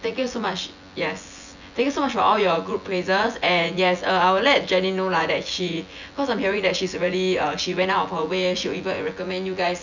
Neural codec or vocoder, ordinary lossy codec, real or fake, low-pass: vocoder, 24 kHz, 100 mel bands, Vocos; none; fake; 7.2 kHz